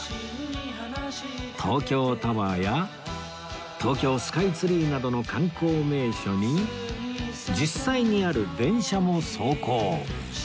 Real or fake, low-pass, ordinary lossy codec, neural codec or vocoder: real; none; none; none